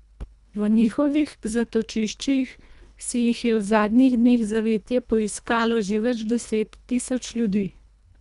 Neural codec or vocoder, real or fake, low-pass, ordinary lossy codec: codec, 24 kHz, 1.5 kbps, HILCodec; fake; 10.8 kHz; none